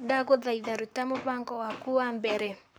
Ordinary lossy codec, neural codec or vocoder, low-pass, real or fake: none; codec, 44.1 kHz, 7.8 kbps, Pupu-Codec; none; fake